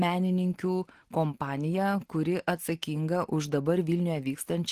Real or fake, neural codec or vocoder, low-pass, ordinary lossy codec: real; none; 14.4 kHz; Opus, 16 kbps